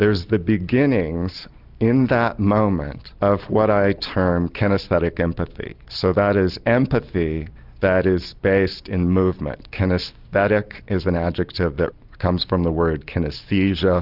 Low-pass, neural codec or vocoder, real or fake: 5.4 kHz; none; real